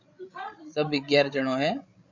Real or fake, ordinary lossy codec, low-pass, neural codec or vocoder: fake; AAC, 48 kbps; 7.2 kHz; codec, 16 kHz, 16 kbps, FreqCodec, larger model